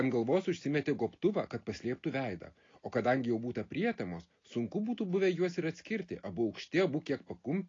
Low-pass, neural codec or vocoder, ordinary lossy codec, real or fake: 7.2 kHz; none; AAC, 32 kbps; real